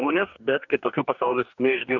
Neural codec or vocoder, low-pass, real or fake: codec, 44.1 kHz, 2.6 kbps, DAC; 7.2 kHz; fake